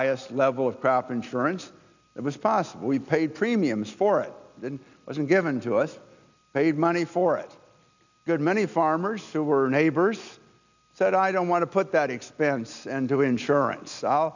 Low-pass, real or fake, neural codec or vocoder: 7.2 kHz; real; none